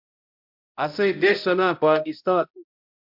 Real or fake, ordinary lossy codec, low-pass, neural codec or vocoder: fake; MP3, 48 kbps; 5.4 kHz; codec, 16 kHz, 0.5 kbps, X-Codec, HuBERT features, trained on balanced general audio